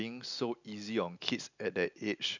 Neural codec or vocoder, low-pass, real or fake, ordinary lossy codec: none; 7.2 kHz; real; none